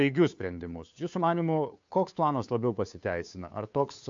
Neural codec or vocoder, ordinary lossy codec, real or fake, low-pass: codec, 16 kHz, 2 kbps, FunCodec, trained on Chinese and English, 25 frames a second; AAC, 64 kbps; fake; 7.2 kHz